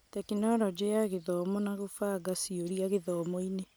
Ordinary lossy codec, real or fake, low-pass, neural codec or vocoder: none; real; none; none